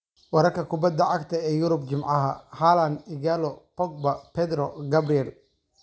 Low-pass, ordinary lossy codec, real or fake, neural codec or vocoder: none; none; real; none